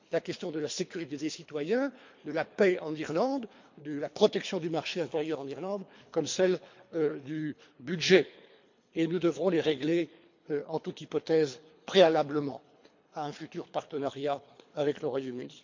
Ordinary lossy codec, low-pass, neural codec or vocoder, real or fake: MP3, 48 kbps; 7.2 kHz; codec, 24 kHz, 3 kbps, HILCodec; fake